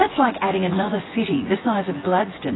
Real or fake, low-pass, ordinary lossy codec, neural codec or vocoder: fake; 7.2 kHz; AAC, 16 kbps; vocoder, 24 kHz, 100 mel bands, Vocos